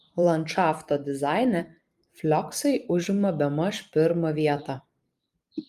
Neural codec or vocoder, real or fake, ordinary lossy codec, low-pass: vocoder, 44.1 kHz, 128 mel bands every 256 samples, BigVGAN v2; fake; Opus, 32 kbps; 14.4 kHz